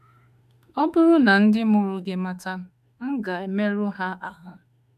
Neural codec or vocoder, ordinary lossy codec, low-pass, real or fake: autoencoder, 48 kHz, 32 numbers a frame, DAC-VAE, trained on Japanese speech; AAC, 96 kbps; 14.4 kHz; fake